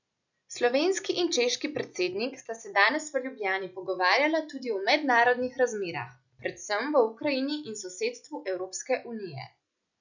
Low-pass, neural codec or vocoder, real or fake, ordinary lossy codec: 7.2 kHz; none; real; none